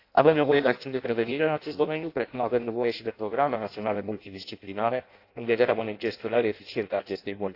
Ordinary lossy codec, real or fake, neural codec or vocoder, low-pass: AAC, 32 kbps; fake; codec, 16 kHz in and 24 kHz out, 0.6 kbps, FireRedTTS-2 codec; 5.4 kHz